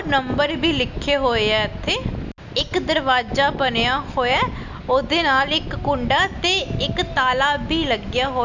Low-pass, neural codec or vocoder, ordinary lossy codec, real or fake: 7.2 kHz; none; none; real